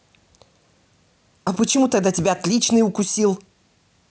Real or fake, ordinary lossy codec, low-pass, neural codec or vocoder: real; none; none; none